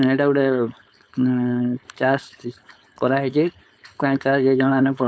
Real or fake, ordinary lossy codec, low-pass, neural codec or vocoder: fake; none; none; codec, 16 kHz, 4.8 kbps, FACodec